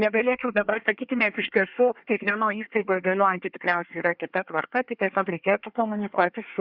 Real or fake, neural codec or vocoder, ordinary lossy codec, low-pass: fake; codec, 24 kHz, 1 kbps, SNAC; Opus, 64 kbps; 5.4 kHz